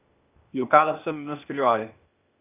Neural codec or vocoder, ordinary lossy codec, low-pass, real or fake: codec, 16 kHz, 0.8 kbps, ZipCodec; none; 3.6 kHz; fake